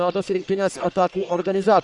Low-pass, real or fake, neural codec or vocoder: 10.8 kHz; fake; codec, 44.1 kHz, 1.7 kbps, Pupu-Codec